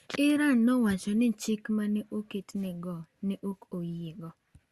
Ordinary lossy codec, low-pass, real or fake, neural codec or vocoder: Opus, 64 kbps; 14.4 kHz; real; none